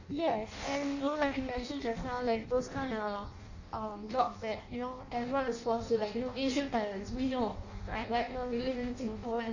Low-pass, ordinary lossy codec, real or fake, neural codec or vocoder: 7.2 kHz; none; fake; codec, 16 kHz in and 24 kHz out, 0.6 kbps, FireRedTTS-2 codec